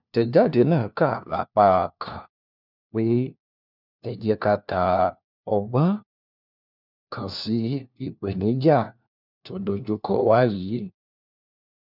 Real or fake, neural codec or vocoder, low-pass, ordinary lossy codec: fake; codec, 16 kHz, 1 kbps, FunCodec, trained on LibriTTS, 50 frames a second; 5.4 kHz; none